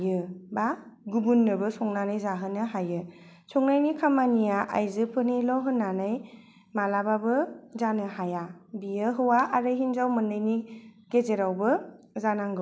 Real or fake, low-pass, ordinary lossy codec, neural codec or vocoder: real; none; none; none